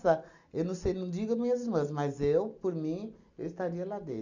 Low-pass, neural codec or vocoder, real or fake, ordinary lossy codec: 7.2 kHz; none; real; none